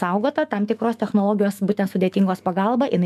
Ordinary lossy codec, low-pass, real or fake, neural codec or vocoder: AAC, 96 kbps; 14.4 kHz; fake; autoencoder, 48 kHz, 128 numbers a frame, DAC-VAE, trained on Japanese speech